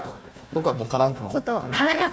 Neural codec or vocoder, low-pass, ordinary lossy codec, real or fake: codec, 16 kHz, 1 kbps, FunCodec, trained on Chinese and English, 50 frames a second; none; none; fake